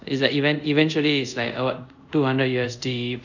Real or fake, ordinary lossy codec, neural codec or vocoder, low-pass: fake; none; codec, 24 kHz, 0.5 kbps, DualCodec; 7.2 kHz